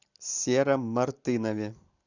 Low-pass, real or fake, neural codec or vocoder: 7.2 kHz; real; none